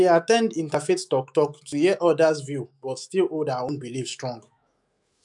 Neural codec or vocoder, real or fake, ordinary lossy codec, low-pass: autoencoder, 48 kHz, 128 numbers a frame, DAC-VAE, trained on Japanese speech; fake; none; 10.8 kHz